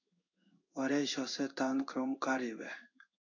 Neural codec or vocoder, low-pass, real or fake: codec, 16 kHz in and 24 kHz out, 1 kbps, XY-Tokenizer; 7.2 kHz; fake